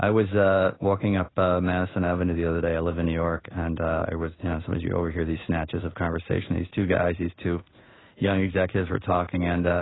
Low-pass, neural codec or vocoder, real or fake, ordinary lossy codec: 7.2 kHz; codec, 16 kHz in and 24 kHz out, 1 kbps, XY-Tokenizer; fake; AAC, 16 kbps